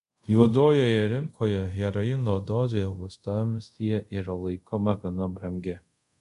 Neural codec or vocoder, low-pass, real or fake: codec, 24 kHz, 0.5 kbps, DualCodec; 10.8 kHz; fake